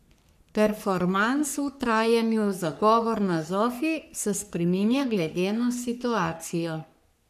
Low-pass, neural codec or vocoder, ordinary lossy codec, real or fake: 14.4 kHz; codec, 44.1 kHz, 3.4 kbps, Pupu-Codec; none; fake